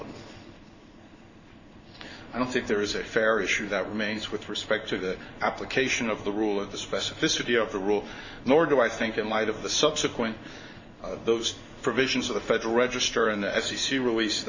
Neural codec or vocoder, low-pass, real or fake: codec, 16 kHz in and 24 kHz out, 1 kbps, XY-Tokenizer; 7.2 kHz; fake